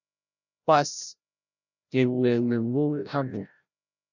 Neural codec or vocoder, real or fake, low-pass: codec, 16 kHz, 0.5 kbps, FreqCodec, larger model; fake; 7.2 kHz